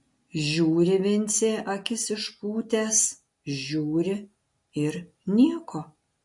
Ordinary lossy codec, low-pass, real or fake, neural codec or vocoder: MP3, 48 kbps; 10.8 kHz; real; none